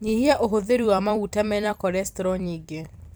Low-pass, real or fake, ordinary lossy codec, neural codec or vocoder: none; fake; none; vocoder, 44.1 kHz, 128 mel bands every 256 samples, BigVGAN v2